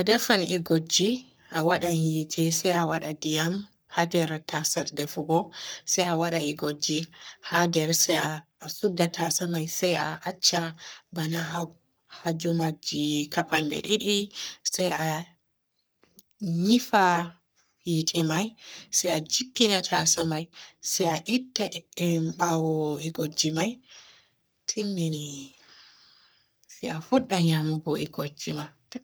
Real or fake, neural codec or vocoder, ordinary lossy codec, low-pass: fake; codec, 44.1 kHz, 3.4 kbps, Pupu-Codec; none; none